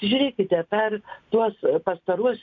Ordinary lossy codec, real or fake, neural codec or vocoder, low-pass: MP3, 64 kbps; real; none; 7.2 kHz